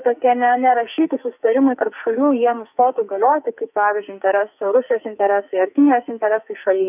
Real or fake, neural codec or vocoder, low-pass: fake; codec, 44.1 kHz, 2.6 kbps, SNAC; 3.6 kHz